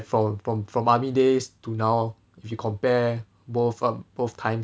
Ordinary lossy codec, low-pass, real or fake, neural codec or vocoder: none; none; real; none